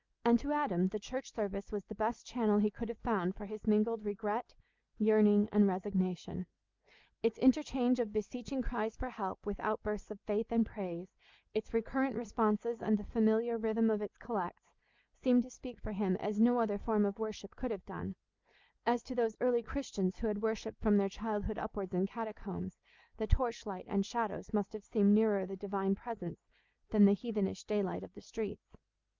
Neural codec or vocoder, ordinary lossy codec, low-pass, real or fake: none; Opus, 24 kbps; 7.2 kHz; real